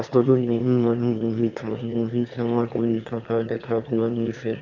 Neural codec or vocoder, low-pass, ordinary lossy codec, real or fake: autoencoder, 22.05 kHz, a latent of 192 numbers a frame, VITS, trained on one speaker; 7.2 kHz; Opus, 64 kbps; fake